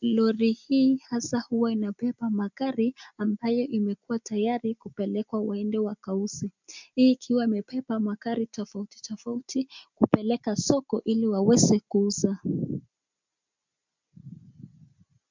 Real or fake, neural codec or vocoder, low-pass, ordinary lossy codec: real; none; 7.2 kHz; MP3, 64 kbps